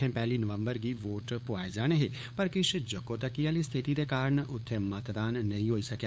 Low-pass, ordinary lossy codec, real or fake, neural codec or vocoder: none; none; fake; codec, 16 kHz, 8 kbps, FunCodec, trained on LibriTTS, 25 frames a second